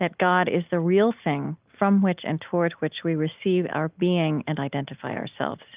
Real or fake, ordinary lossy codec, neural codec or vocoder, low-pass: real; Opus, 24 kbps; none; 3.6 kHz